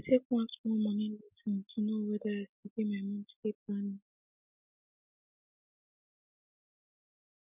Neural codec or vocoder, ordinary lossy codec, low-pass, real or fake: none; none; 3.6 kHz; real